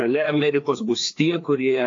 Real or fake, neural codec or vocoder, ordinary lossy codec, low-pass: fake; codec, 16 kHz, 2 kbps, FreqCodec, larger model; AAC, 48 kbps; 7.2 kHz